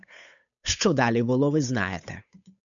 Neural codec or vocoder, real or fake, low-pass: codec, 16 kHz, 8 kbps, FunCodec, trained on Chinese and English, 25 frames a second; fake; 7.2 kHz